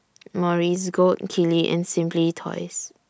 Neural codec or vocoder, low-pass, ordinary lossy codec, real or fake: none; none; none; real